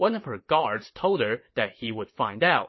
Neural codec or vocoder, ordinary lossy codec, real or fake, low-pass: vocoder, 22.05 kHz, 80 mel bands, WaveNeXt; MP3, 24 kbps; fake; 7.2 kHz